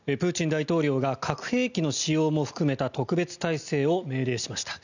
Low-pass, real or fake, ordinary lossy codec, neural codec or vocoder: 7.2 kHz; real; none; none